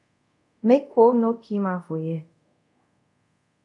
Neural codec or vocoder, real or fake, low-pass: codec, 24 kHz, 0.5 kbps, DualCodec; fake; 10.8 kHz